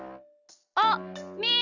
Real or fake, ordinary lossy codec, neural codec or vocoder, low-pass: real; Opus, 64 kbps; none; 7.2 kHz